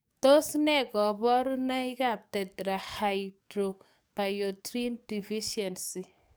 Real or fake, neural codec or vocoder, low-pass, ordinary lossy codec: fake; codec, 44.1 kHz, 7.8 kbps, DAC; none; none